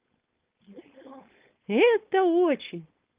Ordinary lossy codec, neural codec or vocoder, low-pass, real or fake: Opus, 32 kbps; codec, 16 kHz, 4.8 kbps, FACodec; 3.6 kHz; fake